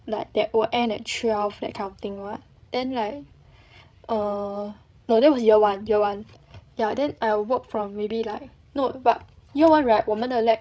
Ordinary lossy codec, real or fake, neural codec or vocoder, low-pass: none; fake; codec, 16 kHz, 16 kbps, FreqCodec, larger model; none